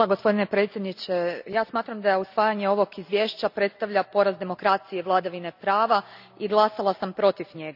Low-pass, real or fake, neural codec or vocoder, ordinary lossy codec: 5.4 kHz; real; none; none